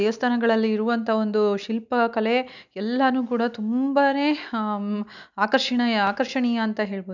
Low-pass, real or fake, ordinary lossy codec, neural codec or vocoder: 7.2 kHz; real; none; none